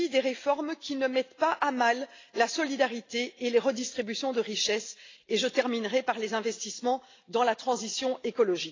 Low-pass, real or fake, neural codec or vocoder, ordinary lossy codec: 7.2 kHz; real; none; AAC, 32 kbps